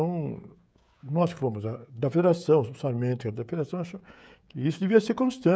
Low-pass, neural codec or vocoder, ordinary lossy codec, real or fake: none; codec, 16 kHz, 16 kbps, FreqCodec, smaller model; none; fake